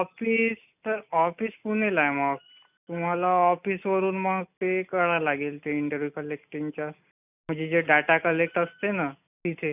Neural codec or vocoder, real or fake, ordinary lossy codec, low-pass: none; real; none; 3.6 kHz